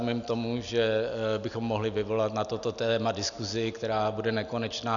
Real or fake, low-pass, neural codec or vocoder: real; 7.2 kHz; none